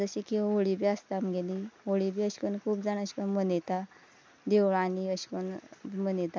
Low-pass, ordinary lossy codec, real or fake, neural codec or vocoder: none; none; real; none